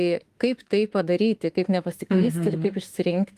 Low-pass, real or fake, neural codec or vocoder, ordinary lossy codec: 14.4 kHz; fake; autoencoder, 48 kHz, 32 numbers a frame, DAC-VAE, trained on Japanese speech; Opus, 24 kbps